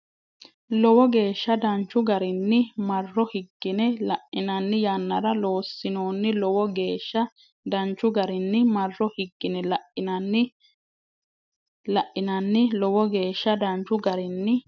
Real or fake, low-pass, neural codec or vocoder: real; 7.2 kHz; none